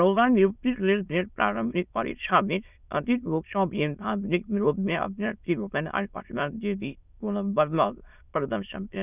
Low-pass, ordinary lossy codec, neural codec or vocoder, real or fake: 3.6 kHz; none; autoencoder, 22.05 kHz, a latent of 192 numbers a frame, VITS, trained on many speakers; fake